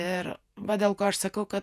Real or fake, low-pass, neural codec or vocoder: fake; 14.4 kHz; vocoder, 48 kHz, 128 mel bands, Vocos